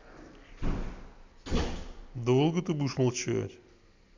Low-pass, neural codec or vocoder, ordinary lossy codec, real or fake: 7.2 kHz; none; MP3, 64 kbps; real